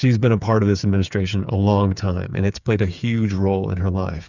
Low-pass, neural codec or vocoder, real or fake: 7.2 kHz; codec, 16 kHz, 8 kbps, FreqCodec, smaller model; fake